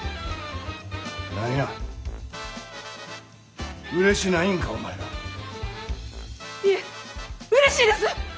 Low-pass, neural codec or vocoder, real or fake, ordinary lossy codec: none; none; real; none